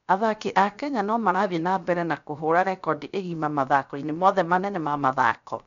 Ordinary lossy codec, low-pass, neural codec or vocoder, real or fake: none; 7.2 kHz; codec, 16 kHz, 0.7 kbps, FocalCodec; fake